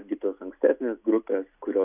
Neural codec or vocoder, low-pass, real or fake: none; 3.6 kHz; real